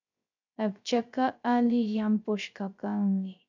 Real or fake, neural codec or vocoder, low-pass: fake; codec, 16 kHz, 0.3 kbps, FocalCodec; 7.2 kHz